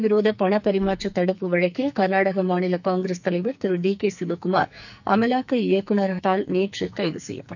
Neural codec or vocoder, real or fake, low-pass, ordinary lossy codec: codec, 44.1 kHz, 2.6 kbps, SNAC; fake; 7.2 kHz; none